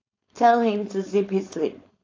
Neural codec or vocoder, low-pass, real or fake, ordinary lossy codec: codec, 16 kHz, 4.8 kbps, FACodec; 7.2 kHz; fake; AAC, 32 kbps